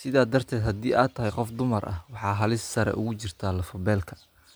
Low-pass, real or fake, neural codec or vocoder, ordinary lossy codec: none; real; none; none